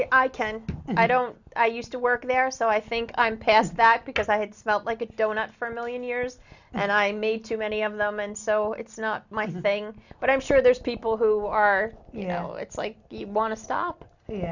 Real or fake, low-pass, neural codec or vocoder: real; 7.2 kHz; none